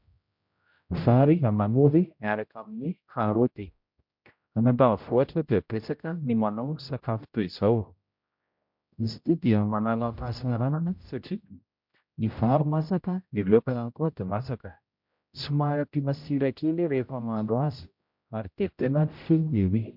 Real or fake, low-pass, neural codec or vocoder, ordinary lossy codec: fake; 5.4 kHz; codec, 16 kHz, 0.5 kbps, X-Codec, HuBERT features, trained on general audio; MP3, 48 kbps